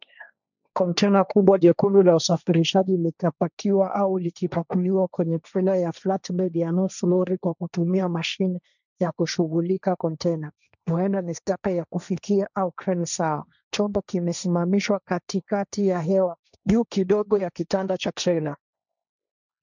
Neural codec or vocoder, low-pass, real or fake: codec, 16 kHz, 1.1 kbps, Voila-Tokenizer; 7.2 kHz; fake